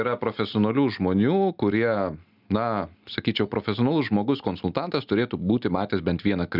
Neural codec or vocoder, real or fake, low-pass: none; real; 5.4 kHz